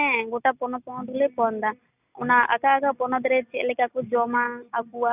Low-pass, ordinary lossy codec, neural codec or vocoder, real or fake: 3.6 kHz; none; none; real